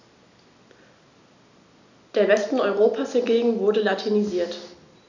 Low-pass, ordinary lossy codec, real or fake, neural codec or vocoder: 7.2 kHz; none; real; none